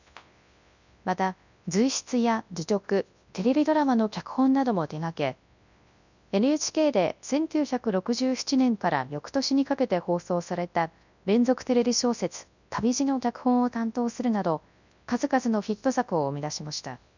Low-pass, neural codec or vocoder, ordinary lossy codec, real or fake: 7.2 kHz; codec, 24 kHz, 0.9 kbps, WavTokenizer, large speech release; none; fake